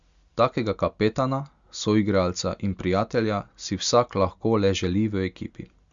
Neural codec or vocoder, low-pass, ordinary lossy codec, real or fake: none; 7.2 kHz; Opus, 64 kbps; real